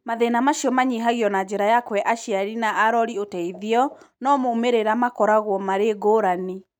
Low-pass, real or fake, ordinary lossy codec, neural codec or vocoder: 19.8 kHz; real; none; none